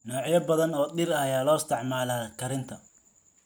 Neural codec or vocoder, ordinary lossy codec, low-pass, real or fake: none; none; none; real